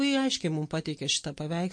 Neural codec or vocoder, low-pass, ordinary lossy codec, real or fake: none; 9.9 kHz; MP3, 48 kbps; real